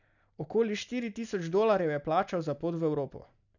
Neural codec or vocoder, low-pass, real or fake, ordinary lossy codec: none; 7.2 kHz; real; none